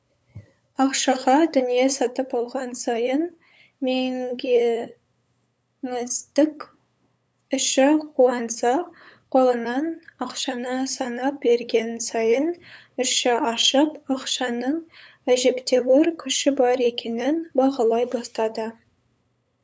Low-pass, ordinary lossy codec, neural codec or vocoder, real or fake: none; none; codec, 16 kHz, 8 kbps, FunCodec, trained on LibriTTS, 25 frames a second; fake